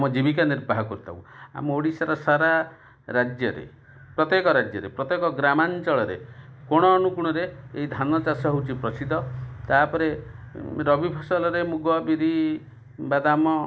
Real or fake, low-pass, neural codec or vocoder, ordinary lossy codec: real; none; none; none